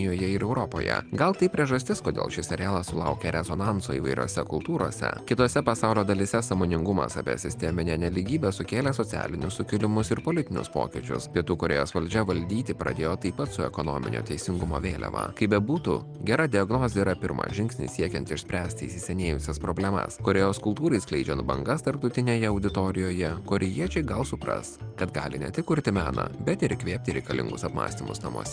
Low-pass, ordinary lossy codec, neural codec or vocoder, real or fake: 9.9 kHz; Opus, 32 kbps; none; real